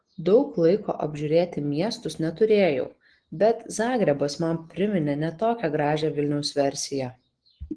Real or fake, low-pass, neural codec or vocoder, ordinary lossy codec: real; 9.9 kHz; none; Opus, 16 kbps